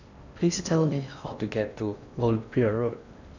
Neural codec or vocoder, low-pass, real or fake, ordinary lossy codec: codec, 16 kHz in and 24 kHz out, 0.8 kbps, FocalCodec, streaming, 65536 codes; 7.2 kHz; fake; none